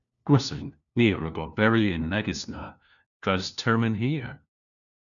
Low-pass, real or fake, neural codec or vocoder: 7.2 kHz; fake; codec, 16 kHz, 1 kbps, FunCodec, trained on LibriTTS, 50 frames a second